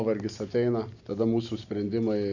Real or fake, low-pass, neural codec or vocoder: real; 7.2 kHz; none